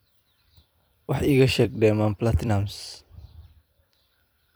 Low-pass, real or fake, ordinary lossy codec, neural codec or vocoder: none; fake; none; vocoder, 44.1 kHz, 128 mel bands every 256 samples, BigVGAN v2